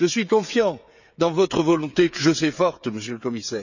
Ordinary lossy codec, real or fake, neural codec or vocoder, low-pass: none; fake; codec, 16 kHz, 16 kbps, FreqCodec, smaller model; 7.2 kHz